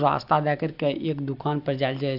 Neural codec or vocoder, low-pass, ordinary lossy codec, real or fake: none; 5.4 kHz; AAC, 48 kbps; real